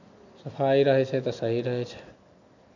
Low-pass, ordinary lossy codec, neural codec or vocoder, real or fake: 7.2 kHz; none; none; real